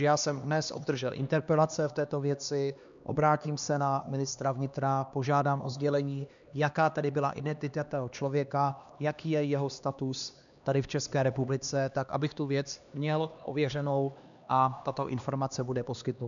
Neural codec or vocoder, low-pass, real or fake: codec, 16 kHz, 2 kbps, X-Codec, HuBERT features, trained on LibriSpeech; 7.2 kHz; fake